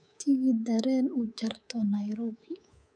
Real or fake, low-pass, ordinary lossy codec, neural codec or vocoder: fake; 9.9 kHz; none; codec, 24 kHz, 3.1 kbps, DualCodec